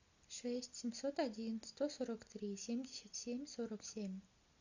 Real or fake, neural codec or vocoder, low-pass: real; none; 7.2 kHz